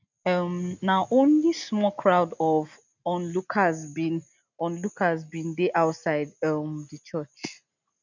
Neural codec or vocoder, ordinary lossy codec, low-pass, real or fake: none; none; 7.2 kHz; real